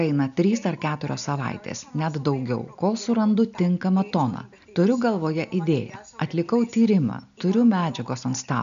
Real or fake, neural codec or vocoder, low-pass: real; none; 7.2 kHz